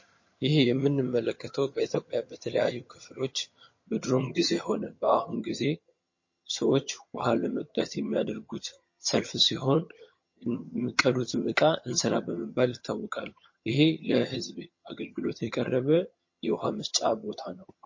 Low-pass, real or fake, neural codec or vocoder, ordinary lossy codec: 7.2 kHz; fake; vocoder, 22.05 kHz, 80 mel bands, HiFi-GAN; MP3, 32 kbps